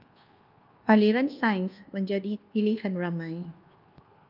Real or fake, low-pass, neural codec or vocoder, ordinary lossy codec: fake; 5.4 kHz; codec, 24 kHz, 1.2 kbps, DualCodec; Opus, 32 kbps